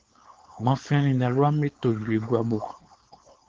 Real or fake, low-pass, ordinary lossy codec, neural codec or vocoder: fake; 7.2 kHz; Opus, 16 kbps; codec, 16 kHz, 4.8 kbps, FACodec